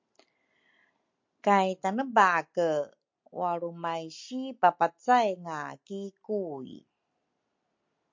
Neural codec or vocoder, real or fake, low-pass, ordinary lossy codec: none; real; 7.2 kHz; MP3, 48 kbps